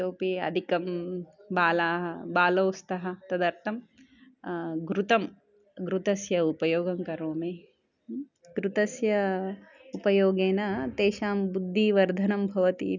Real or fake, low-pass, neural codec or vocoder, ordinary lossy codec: real; 7.2 kHz; none; none